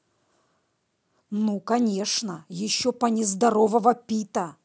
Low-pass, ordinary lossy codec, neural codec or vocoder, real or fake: none; none; none; real